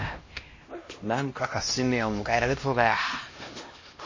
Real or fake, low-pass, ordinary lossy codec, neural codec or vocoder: fake; 7.2 kHz; MP3, 32 kbps; codec, 16 kHz, 1 kbps, X-Codec, HuBERT features, trained on LibriSpeech